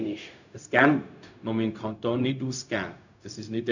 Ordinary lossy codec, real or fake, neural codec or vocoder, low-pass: none; fake; codec, 16 kHz, 0.4 kbps, LongCat-Audio-Codec; 7.2 kHz